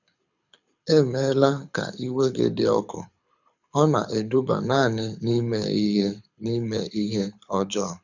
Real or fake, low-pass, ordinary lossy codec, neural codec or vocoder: fake; 7.2 kHz; none; codec, 24 kHz, 6 kbps, HILCodec